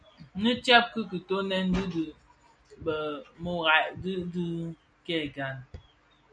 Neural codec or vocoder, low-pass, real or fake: none; 9.9 kHz; real